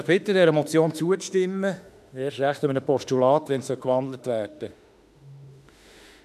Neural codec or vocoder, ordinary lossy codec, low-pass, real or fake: autoencoder, 48 kHz, 32 numbers a frame, DAC-VAE, trained on Japanese speech; none; 14.4 kHz; fake